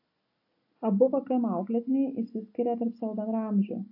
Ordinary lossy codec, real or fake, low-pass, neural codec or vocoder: AAC, 32 kbps; real; 5.4 kHz; none